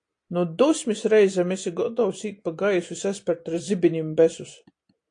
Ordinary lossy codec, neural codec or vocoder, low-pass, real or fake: AAC, 48 kbps; none; 9.9 kHz; real